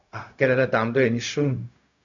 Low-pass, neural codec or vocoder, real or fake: 7.2 kHz; codec, 16 kHz, 0.4 kbps, LongCat-Audio-Codec; fake